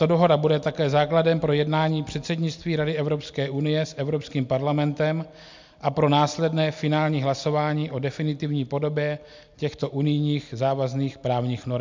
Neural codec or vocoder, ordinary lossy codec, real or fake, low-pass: none; MP3, 64 kbps; real; 7.2 kHz